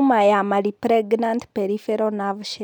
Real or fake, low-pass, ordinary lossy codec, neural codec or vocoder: real; 19.8 kHz; none; none